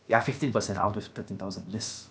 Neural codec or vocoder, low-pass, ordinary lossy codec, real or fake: codec, 16 kHz, about 1 kbps, DyCAST, with the encoder's durations; none; none; fake